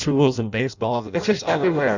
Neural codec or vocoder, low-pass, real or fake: codec, 16 kHz in and 24 kHz out, 0.6 kbps, FireRedTTS-2 codec; 7.2 kHz; fake